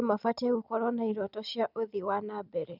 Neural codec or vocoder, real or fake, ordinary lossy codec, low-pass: none; real; none; 5.4 kHz